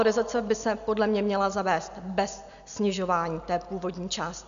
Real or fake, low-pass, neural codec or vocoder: real; 7.2 kHz; none